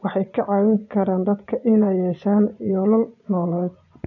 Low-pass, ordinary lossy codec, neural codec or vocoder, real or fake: 7.2 kHz; none; vocoder, 24 kHz, 100 mel bands, Vocos; fake